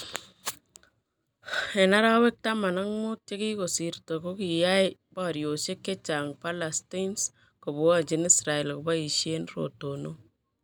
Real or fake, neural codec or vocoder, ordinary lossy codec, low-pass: real; none; none; none